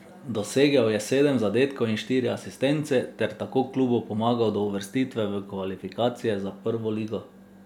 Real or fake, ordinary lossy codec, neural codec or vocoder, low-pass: real; none; none; 19.8 kHz